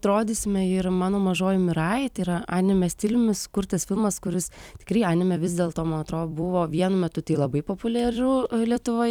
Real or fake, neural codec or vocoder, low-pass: fake; vocoder, 44.1 kHz, 128 mel bands every 256 samples, BigVGAN v2; 19.8 kHz